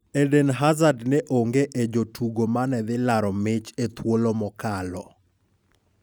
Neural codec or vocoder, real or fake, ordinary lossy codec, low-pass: none; real; none; none